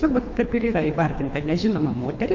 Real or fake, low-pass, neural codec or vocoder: fake; 7.2 kHz; codec, 24 kHz, 3 kbps, HILCodec